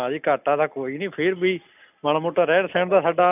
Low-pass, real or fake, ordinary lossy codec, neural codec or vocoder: 3.6 kHz; real; none; none